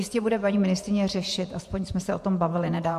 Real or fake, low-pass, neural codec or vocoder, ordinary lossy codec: fake; 14.4 kHz; vocoder, 48 kHz, 128 mel bands, Vocos; MP3, 64 kbps